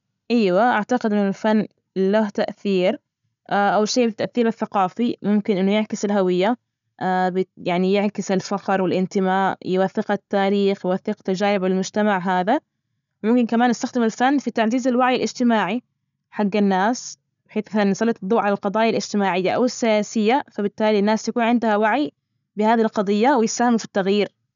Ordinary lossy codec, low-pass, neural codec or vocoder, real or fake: MP3, 96 kbps; 7.2 kHz; none; real